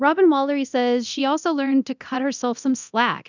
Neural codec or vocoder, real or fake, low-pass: codec, 24 kHz, 0.9 kbps, DualCodec; fake; 7.2 kHz